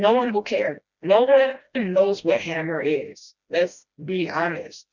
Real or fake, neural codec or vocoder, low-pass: fake; codec, 16 kHz, 1 kbps, FreqCodec, smaller model; 7.2 kHz